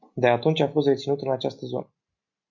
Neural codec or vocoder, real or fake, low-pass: none; real; 7.2 kHz